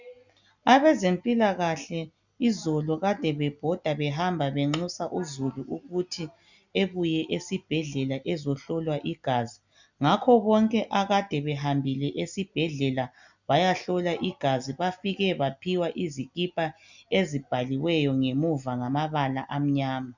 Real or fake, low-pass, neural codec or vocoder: real; 7.2 kHz; none